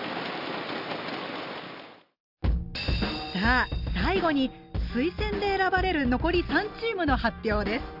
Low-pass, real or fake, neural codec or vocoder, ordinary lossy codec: 5.4 kHz; real; none; none